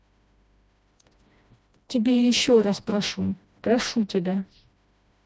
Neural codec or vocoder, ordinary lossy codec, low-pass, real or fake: codec, 16 kHz, 1 kbps, FreqCodec, smaller model; none; none; fake